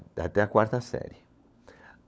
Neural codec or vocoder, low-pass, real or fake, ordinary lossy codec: codec, 16 kHz, 16 kbps, FunCodec, trained on LibriTTS, 50 frames a second; none; fake; none